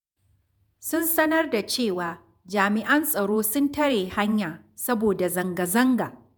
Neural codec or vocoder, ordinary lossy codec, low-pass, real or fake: vocoder, 48 kHz, 128 mel bands, Vocos; none; none; fake